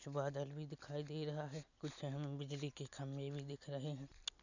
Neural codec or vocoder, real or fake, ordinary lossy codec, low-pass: none; real; none; 7.2 kHz